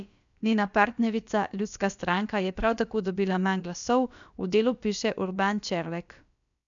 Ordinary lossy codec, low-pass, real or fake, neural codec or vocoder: none; 7.2 kHz; fake; codec, 16 kHz, about 1 kbps, DyCAST, with the encoder's durations